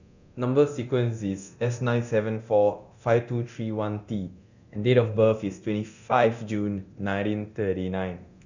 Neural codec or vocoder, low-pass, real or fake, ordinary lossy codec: codec, 24 kHz, 0.9 kbps, DualCodec; 7.2 kHz; fake; none